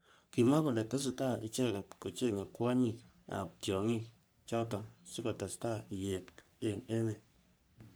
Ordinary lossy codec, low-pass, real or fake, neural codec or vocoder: none; none; fake; codec, 44.1 kHz, 3.4 kbps, Pupu-Codec